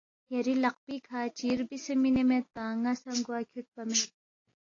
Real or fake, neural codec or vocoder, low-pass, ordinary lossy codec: real; none; 7.2 kHz; AAC, 32 kbps